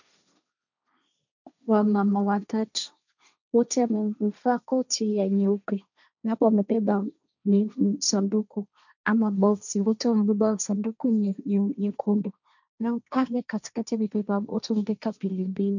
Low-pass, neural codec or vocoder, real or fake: 7.2 kHz; codec, 16 kHz, 1.1 kbps, Voila-Tokenizer; fake